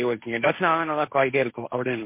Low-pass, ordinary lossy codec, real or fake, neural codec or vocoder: 3.6 kHz; MP3, 24 kbps; fake; codec, 16 kHz, 1.1 kbps, Voila-Tokenizer